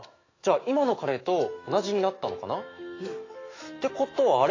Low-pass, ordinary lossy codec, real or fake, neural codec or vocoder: 7.2 kHz; AAC, 32 kbps; fake; autoencoder, 48 kHz, 128 numbers a frame, DAC-VAE, trained on Japanese speech